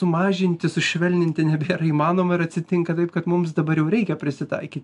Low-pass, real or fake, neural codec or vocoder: 10.8 kHz; real; none